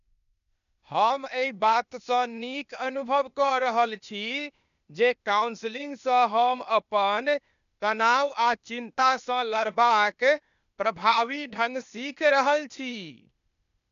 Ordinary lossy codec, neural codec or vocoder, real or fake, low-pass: none; codec, 16 kHz, 0.8 kbps, ZipCodec; fake; 7.2 kHz